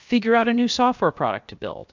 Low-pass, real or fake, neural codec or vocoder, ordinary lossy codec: 7.2 kHz; fake; codec, 16 kHz, 0.7 kbps, FocalCodec; MP3, 64 kbps